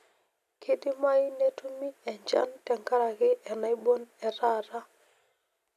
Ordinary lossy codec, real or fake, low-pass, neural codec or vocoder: none; real; 14.4 kHz; none